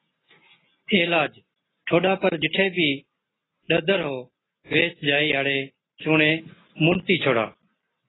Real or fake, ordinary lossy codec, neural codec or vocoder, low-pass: real; AAC, 16 kbps; none; 7.2 kHz